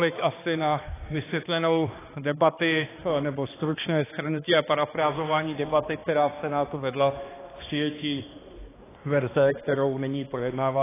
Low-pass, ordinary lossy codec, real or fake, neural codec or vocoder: 3.6 kHz; AAC, 16 kbps; fake; codec, 16 kHz, 2 kbps, X-Codec, HuBERT features, trained on balanced general audio